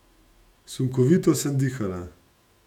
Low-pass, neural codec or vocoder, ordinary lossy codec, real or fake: 19.8 kHz; autoencoder, 48 kHz, 128 numbers a frame, DAC-VAE, trained on Japanese speech; none; fake